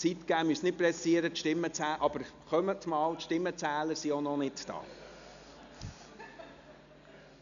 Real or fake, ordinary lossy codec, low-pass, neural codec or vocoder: real; none; 7.2 kHz; none